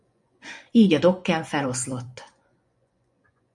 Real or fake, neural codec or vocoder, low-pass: fake; vocoder, 24 kHz, 100 mel bands, Vocos; 10.8 kHz